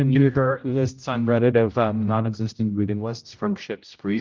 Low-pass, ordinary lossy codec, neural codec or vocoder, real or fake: 7.2 kHz; Opus, 24 kbps; codec, 16 kHz, 0.5 kbps, X-Codec, HuBERT features, trained on general audio; fake